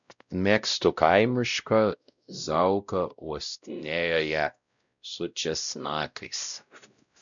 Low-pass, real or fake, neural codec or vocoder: 7.2 kHz; fake; codec, 16 kHz, 0.5 kbps, X-Codec, WavLM features, trained on Multilingual LibriSpeech